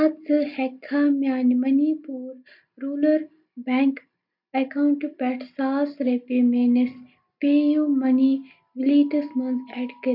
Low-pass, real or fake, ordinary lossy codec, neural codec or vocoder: 5.4 kHz; real; none; none